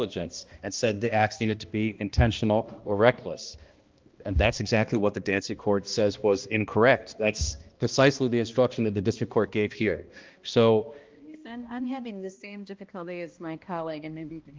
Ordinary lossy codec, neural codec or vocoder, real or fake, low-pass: Opus, 32 kbps; codec, 16 kHz, 1 kbps, X-Codec, HuBERT features, trained on balanced general audio; fake; 7.2 kHz